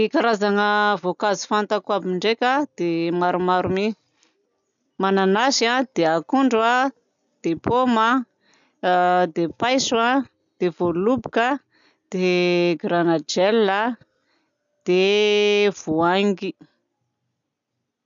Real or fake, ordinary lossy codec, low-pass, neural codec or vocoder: real; none; 7.2 kHz; none